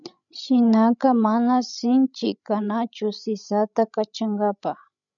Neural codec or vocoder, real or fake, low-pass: codec, 16 kHz, 16 kbps, FreqCodec, larger model; fake; 7.2 kHz